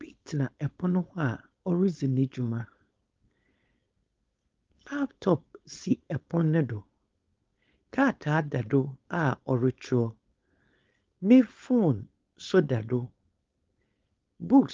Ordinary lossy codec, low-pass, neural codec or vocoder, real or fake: Opus, 32 kbps; 7.2 kHz; codec, 16 kHz, 4.8 kbps, FACodec; fake